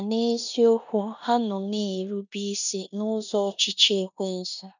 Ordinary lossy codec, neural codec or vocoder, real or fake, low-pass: none; codec, 16 kHz in and 24 kHz out, 0.9 kbps, LongCat-Audio-Codec, four codebook decoder; fake; 7.2 kHz